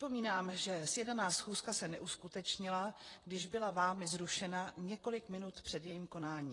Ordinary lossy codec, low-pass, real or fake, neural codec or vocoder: AAC, 32 kbps; 10.8 kHz; fake; vocoder, 44.1 kHz, 128 mel bands, Pupu-Vocoder